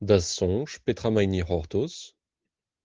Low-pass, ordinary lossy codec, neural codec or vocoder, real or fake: 7.2 kHz; Opus, 16 kbps; none; real